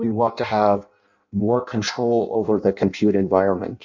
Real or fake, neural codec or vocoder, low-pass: fake; codec, 16 kHz in and 24 kHz out, 0.6 kbps, FireRedTTS-2 codec; 7.2 kHz